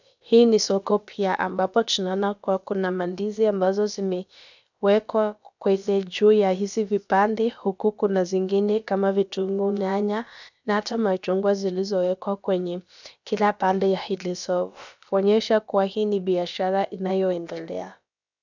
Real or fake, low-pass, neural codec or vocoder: fake; 7.2 kHz; codec, 16 kHz, about 1 kbps, DyCAST, with the encoder's durations